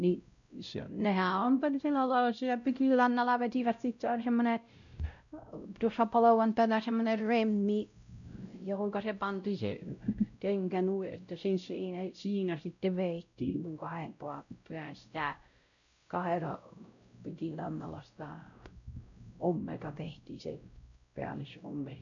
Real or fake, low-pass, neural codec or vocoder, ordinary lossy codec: fake; 7.2 kHz; codec, 16 kHz, 0.5 kbps, X-Codec, WavLM features, trained on Multilingual LibriSpeech; none